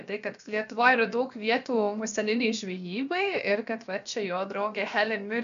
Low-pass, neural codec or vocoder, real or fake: 7.2 kHz; codec, 16 kHz, about 1 kbps, DyCAST, with the encoder's durations; fake